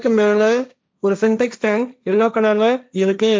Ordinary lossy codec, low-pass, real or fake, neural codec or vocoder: none; none; fake; codec, 16 kHz, 1.1 kbps, Voila-Tokenizer